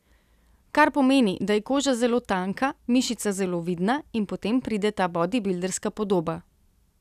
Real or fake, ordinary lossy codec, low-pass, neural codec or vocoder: real; none; 14.4 kHz; none